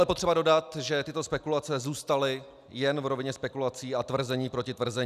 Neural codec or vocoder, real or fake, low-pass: none; real; 14.4 kHz